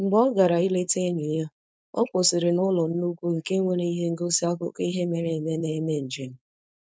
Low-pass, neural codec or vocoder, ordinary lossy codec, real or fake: none; codec, 16 kHz, 4.8 kbps, FACodec; none; fake